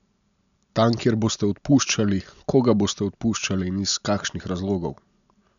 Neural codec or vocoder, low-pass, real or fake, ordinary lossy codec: none; 7.2 kHz; real; none